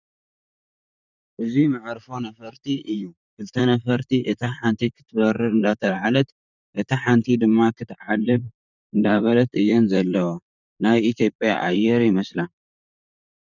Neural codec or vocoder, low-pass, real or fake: vocoder, 44.1 kHz, 128 mel bands, Pupu-Vocoder; 7.2 kHz; fake